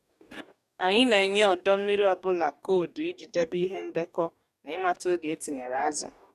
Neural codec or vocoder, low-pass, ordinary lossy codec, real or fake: codec, 44.1 kHz, 2.6 kbps, DAC; 14.4 kHz; none; fake